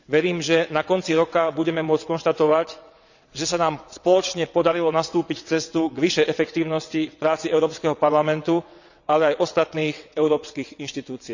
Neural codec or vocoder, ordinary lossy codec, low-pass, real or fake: vocoder, 22.05 kHz, 80 mel bands, WaveNeXt; none; 7.2 kHz; fake